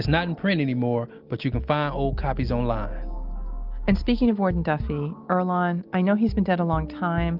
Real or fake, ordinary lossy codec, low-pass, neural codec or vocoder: real; Opus, 32 kbps; 5.4 kHz; none